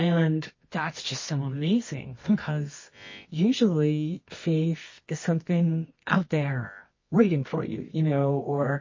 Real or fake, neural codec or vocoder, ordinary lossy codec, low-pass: fake; codec, 24 kHz, 0.9 kbps, WavTokenizer, medium music audio release; MP3, 32 kbps; 7.2 kHz